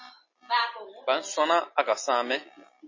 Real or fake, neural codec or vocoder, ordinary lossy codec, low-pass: real; none; MP3, 32 kbps; 7.2 kHz